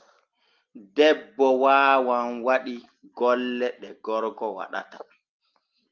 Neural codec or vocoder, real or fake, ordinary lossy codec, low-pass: none; real; Opus, 32 kbps; 7.2 kHz